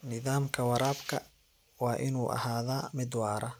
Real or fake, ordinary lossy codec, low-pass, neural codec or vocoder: real; none; none; none